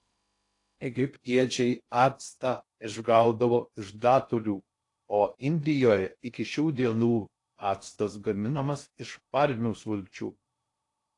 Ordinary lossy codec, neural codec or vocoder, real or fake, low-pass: AAC, 48 kbps; codec, 16 kHz in and 24 kHz out, 0.6 kbps, FocalCodec, streaming, 2048 codes; fake; 10.8 kHz